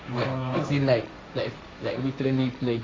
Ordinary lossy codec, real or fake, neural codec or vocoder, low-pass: none; fake; codec, 16 kHz, 1.1 kbps, Voila-Tokenizer; none